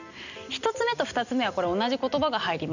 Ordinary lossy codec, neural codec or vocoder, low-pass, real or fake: none; none; 7.2 kHz; real